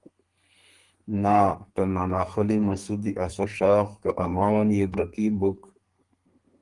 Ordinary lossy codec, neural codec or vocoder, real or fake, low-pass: Opus, 24 kbps; codec, 32 kHz, 1.9 kbps, SNAC; fake; 10.8 kHz